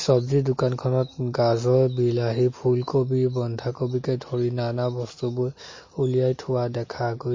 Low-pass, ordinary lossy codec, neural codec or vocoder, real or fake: 7.2 kHz; MP3, 32 kbps; none; real